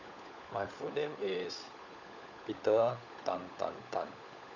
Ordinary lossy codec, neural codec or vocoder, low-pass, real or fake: none; codec, 16 kHz, 16 kbps, FunCodec, trained on LibriTTS, 50 frames a second; 7.2 kHz; fake